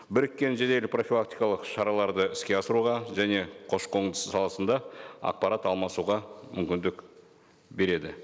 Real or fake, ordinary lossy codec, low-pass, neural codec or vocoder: real; none; none; none